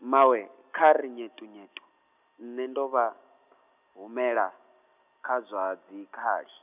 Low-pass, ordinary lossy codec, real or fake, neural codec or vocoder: 3.6 kHz; none; real; none